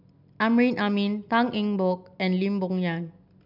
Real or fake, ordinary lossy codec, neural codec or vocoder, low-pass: real; none; none; 5.4 kHz